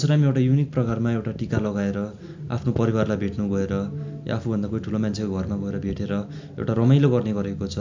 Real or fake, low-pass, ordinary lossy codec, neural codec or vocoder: real; 7.2 kHz; MP3, 64 kbps; none